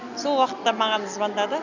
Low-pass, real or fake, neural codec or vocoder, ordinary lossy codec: 7.2 kHz; real; none; none